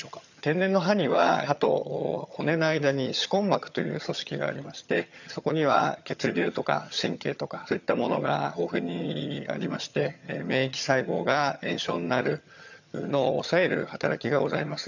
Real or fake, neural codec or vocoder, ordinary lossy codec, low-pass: fake; vocoder, 22.05 kHz, 80 mel bands, HiFi-GAN; none; 7.2 kHz